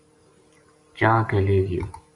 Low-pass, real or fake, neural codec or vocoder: 10.8 kHz; fake; vocoder, 44.1 kHz, 128 mel bands every 512 samples, BigVGAN v2